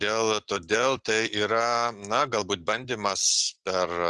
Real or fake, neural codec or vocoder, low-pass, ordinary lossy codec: real; none; 10.8 kHz; Opus, 32 kbps